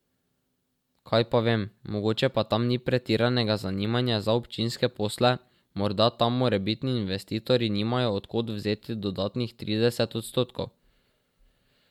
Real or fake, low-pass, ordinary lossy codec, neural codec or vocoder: real; 19.8 kHz; MP3, 96 kbps; none